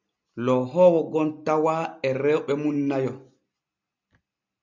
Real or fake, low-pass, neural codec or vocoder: real; 7.2 kHz; none